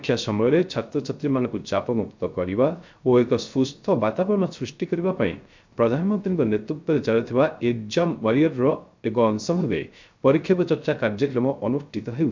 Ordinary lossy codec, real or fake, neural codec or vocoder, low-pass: MP3, 64 kbps; fake; codec, 16 kHz, 0.3 kbps, FocalCodec; 7.2 kHz